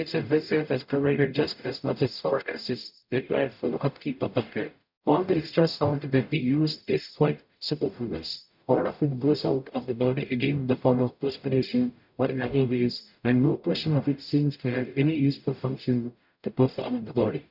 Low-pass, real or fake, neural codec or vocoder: 5.4 kHz; fake; codec, 44.1 kHz, 0.9 kbps, DAC